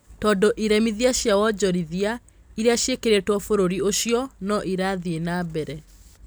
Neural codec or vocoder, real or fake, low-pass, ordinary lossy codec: none; real; none; none